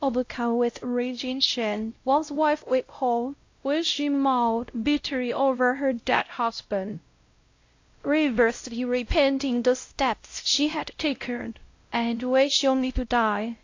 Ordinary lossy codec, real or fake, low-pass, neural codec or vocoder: AAC, 48 kbps; fake; 7.2 kHz; codec, 16 kHz, 0.5 kbps, X-Codec, WavLM features, trained on Multilingual LibriSpeech